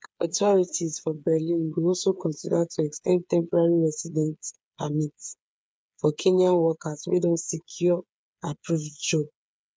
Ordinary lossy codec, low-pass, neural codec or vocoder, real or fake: none; none; codec, 16 kHz, 8 kbps, FreqCodec, smaller model; fake